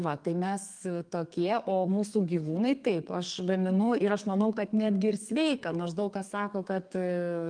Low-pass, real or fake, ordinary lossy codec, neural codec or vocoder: 9.9 kHz; fake; Opus, 32 kbps; codec, 32 kHz, 1.9 kbps, SNAC